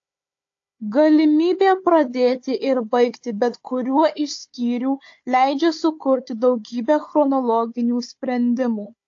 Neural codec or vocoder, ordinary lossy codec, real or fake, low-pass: codec, 16 kHz, 4 kbps, FunCodec, trained on Chinese and English, 50 frames a second; AAC, 48 kbps; fake; 7.2 kHz